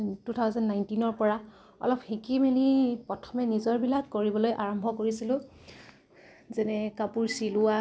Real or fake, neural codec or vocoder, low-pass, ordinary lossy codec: real; none; none; none